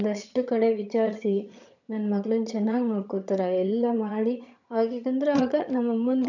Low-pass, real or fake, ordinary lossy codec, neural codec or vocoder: 7.2 kHz; fake; none; vocoder, 22.05 kHz, 80 mel bands, HiFi-GAN